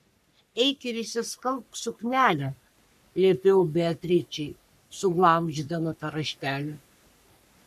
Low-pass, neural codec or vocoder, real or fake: 14.4 kHz; codec, 44.1 kHz, 3.4 kbps, Pupu-Codec; fake